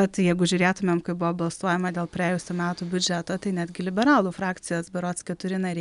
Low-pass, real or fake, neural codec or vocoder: 10.8 kHz; real; none